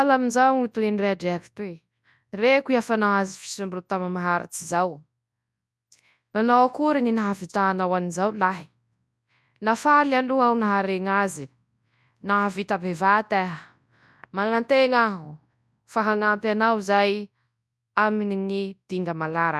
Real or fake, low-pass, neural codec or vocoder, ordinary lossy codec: fake; none; codec, 24 kHz, 0.9 kbps, WavTokenizer, large speech release; none